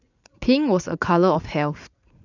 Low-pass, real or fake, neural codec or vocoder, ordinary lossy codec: 7.2 kHz; fake; vocoder, 44.1 kHz, 80 mel bands, Vocos; none